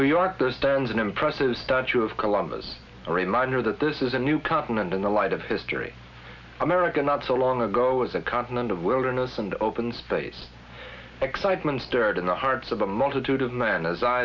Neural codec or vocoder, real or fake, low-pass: none; real; 7.2 kHz